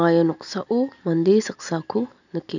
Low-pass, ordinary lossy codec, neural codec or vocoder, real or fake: 7.2 kHz; none; none; real